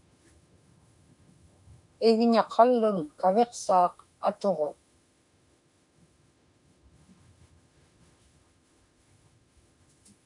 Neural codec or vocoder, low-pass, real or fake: autoencoder, 48 kHz, 32 numbers a frame, DAC-VAE, trained on Japanese speech; 10.8 kHz; fake